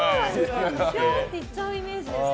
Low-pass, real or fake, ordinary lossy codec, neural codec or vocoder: none; real; none; none